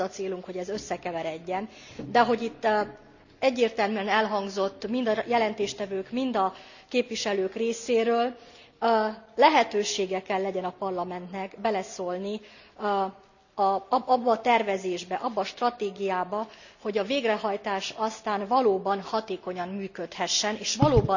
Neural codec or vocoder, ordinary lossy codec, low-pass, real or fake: none; none; 7.2 kHz; real